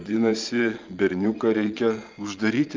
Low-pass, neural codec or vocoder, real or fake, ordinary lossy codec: 7.2 kHz; vocoder, 22.05 kHz, 80 mel bands, Vocos; fake; Opus, 24 kbps